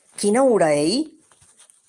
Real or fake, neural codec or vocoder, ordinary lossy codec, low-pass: real; none; Opus, 32 kbps; 10.8 kHz